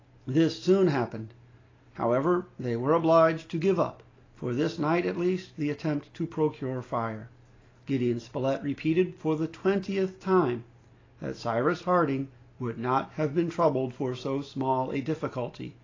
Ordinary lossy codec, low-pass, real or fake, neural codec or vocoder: AAC, 32 kbps; 7.2 kHz; real; none